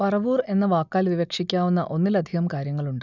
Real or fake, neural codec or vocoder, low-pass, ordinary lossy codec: real; none; 7.2 kHz; none